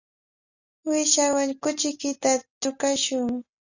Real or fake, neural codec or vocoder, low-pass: real; none; 7.2 kHz